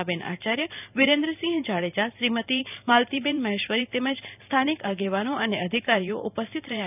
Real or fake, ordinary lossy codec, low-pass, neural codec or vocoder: fake; none; 3.6 kHz; vocoder, 44.1 kHz, 128 mel bands every 512 samples, BigVGAN v2